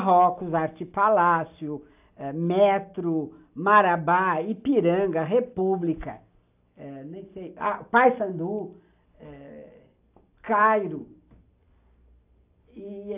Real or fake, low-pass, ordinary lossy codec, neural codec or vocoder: real; 3.6 kHz; AAC, 32 kbps; none